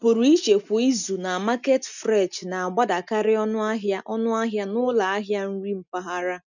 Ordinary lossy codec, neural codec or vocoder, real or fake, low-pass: none; none; real; 7.2 kHz